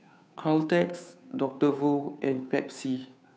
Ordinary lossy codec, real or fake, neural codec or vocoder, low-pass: none; fake; codec, 16 kHz, 2 kbps, FunCodec, trained on Chinese and English, 25 frames a second; none